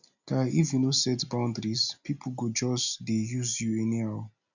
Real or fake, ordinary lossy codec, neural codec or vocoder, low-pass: real; none; none; 7.2 kHz